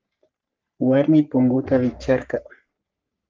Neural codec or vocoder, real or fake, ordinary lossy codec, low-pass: codec, 44.1 kHz, 3.4 kbps, Pupu-Codec; fake; Opus, 32 kbps; 7.2 kHz